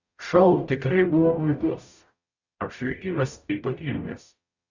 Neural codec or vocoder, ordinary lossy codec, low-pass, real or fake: codec, 44.1 kHz, 0.9 kbps, DAC; Opus, 64 kbps; 7.2 kHz; fake